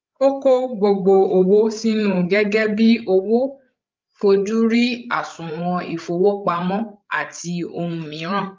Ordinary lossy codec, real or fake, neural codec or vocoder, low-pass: Opus, 24 kbps; fake; codec, 16 kHz, 8 kbps, FreqCodec, larger model; 7.2 kHz